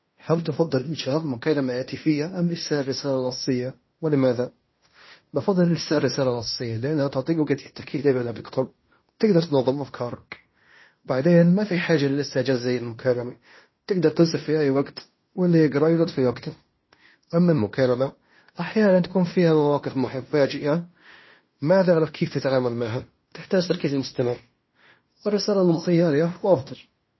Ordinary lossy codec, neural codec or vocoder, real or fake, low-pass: MP3, 24 kbps; codec, 16 kHz in and 24 kHz out, 0.9 kbps, LongCat-Audio-Codec, fine tuned four codebook decoder; fake; 7.2 kHz